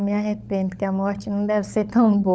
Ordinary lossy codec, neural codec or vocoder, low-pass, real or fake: none; codec, 16 kHz, 16 kbps, FunCodec, trained on LibriTTS, 50 frames a second; none; fake